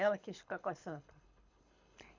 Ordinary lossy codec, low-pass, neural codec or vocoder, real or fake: MP3, 64 kbps; 7.2 kHz; codec, 24 kHz, 6 kbps, HILCodec; fake